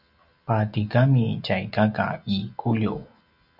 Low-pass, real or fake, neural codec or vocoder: 5.4 kHz; real; none